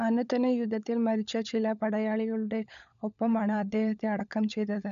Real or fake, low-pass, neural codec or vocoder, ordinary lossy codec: fake; 7.2 kHz; codec, 16 kHz, 16 kbps, FunCodec, trained on LibriTTS, 50 frames a second; none